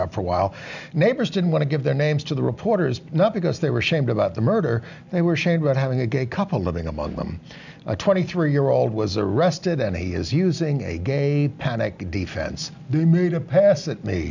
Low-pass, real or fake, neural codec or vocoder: 7.2 kHz; real; none